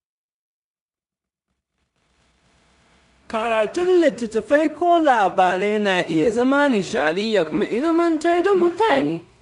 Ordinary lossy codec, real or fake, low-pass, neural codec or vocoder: none; fake; 10.8 kHz; codec, 16 kHz in and 24 kHz out, 0.4 kbps, LongCat-Audio-Codec, two codebook decoder